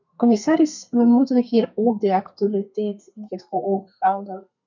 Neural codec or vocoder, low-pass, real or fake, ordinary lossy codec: codec, 32 kHz, 1.9 kbps, SNAC; 7.2 kHz; fake; MP3, 64 kbps